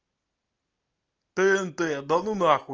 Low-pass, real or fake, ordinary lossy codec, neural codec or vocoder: 7.2 kHz; real; Opus, 24 kbps; none